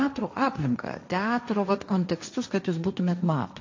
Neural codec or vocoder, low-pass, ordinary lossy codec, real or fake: codec, 16 kHz, 1.1 kbps, Voila-Tokenizer; 7.2 kHz; AAC, 48 kbps; fake